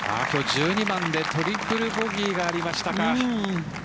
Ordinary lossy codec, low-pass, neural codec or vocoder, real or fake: none; none; none; real